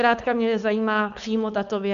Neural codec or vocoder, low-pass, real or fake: codec, 16 kHz, 4.8 kbps, FACodec; 7.2 kHz; fake